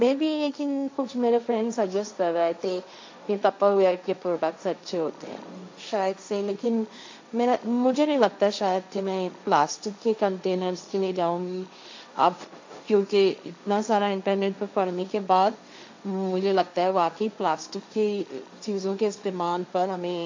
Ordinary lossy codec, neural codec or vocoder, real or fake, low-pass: MP3, 64 kbps; codec, 16 kHz, 1.1 kbps, Voila-Tokenizer; fake; 7.2 kHz